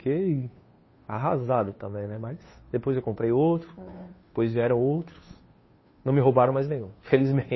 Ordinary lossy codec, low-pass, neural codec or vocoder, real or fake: MP3, 24 kbps; 7.2 kHz; codec, 16 kHz, 2 kbps, FunCodec, trained on Chinese and English, 25 frames a second; fake